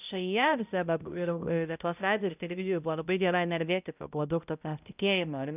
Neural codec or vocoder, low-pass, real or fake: codec, 16 kHz, 0.5 kbps, X-Codec, HuBERT features, trained on balanced general audio; 3.6 kHz; fake